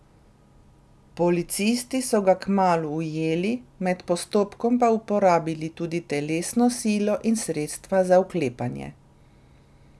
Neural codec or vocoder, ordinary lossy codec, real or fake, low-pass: none; none; real; none